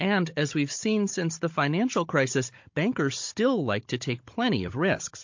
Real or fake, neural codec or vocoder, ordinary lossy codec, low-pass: fake; codec, 16 kHz, 16 kbps, FunCodec, trained on Chinese and English, 50 frames a second; MP3, 48 kbps; 7.2 kHz